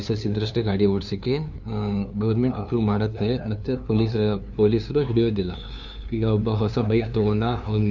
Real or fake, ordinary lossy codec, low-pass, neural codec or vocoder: fake; none; 7.2 kHz; codec, 16 kHz, 2 kbps, FunCodec, trained on LibriTTS, 25 frames a second